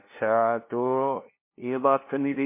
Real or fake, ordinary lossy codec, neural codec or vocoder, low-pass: fake; MP3, 24 kbps; codec, 16 kHz, 0.5 kbps, FunCodec, trained on LibriTTS, 25 frames a second; 3.6 kHz